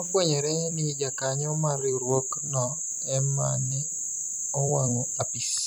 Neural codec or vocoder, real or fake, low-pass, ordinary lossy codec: vocoder, 44.1 kHz, 128 mel bands every 256 samples, BigVGAN v2; fake; none; none